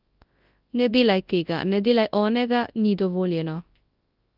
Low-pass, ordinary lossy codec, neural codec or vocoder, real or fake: 5.4 kHz; Opus, 32 kbps; codec, 24 kHz, 0.9 kbps, WavTokenizer, large speech release; fake